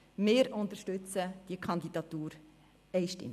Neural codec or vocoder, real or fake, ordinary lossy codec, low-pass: none; real; none; 14.4 kHz